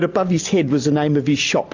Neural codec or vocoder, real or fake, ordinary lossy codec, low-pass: none; real; AAC, 48 kbps; 7.2 kHz